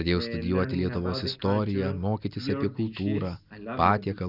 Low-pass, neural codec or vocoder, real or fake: 5.4 kHz; none; real